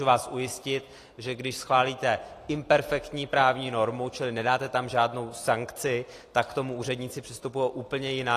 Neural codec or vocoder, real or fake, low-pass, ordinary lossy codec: none; real; 14.4 kHz; AAC, 48 kbps